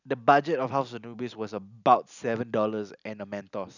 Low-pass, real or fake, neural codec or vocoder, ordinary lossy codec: 7.2 kHz; real; none; none